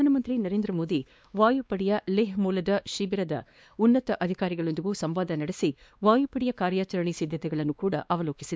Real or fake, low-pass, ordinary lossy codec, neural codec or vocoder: fake; none; none; codec, 16 kHz, 2 kbps, X-Codec, WavLM features, trained on Multilingual LibriSpeech